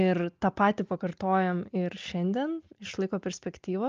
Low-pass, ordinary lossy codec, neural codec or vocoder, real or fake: 7.2 kHz; Opus, 24 kbps; none; real